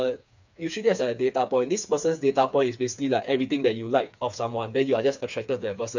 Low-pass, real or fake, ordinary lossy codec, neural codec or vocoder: 7.2 kHz; fake; none; codec, 16 kHz, 4 kbps, FreqCodec, smaller model